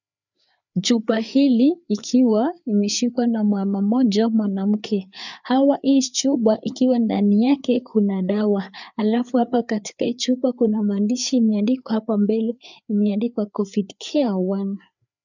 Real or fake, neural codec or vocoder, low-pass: fake; codec, 16 kHz, 4 kbps, FreqCodec, larger model; 7.2 kHz